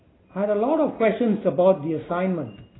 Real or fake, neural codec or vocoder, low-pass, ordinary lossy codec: real; none; 7.2 kHz; AAC, 16 kbps